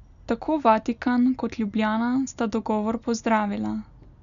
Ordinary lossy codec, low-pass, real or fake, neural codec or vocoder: none; 7.2 kHz; real; none